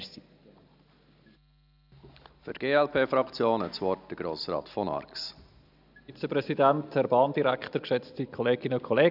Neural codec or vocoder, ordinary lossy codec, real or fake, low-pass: none; none; real; 5.4 kHz